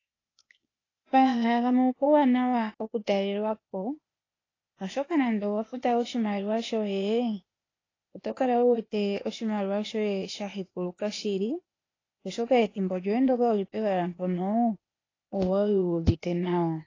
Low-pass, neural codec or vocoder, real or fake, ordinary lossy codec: 7.2 kHz; codec, 16 kHz, 0.8 kbps, ZipCodec; fake; AAC, 32 kbps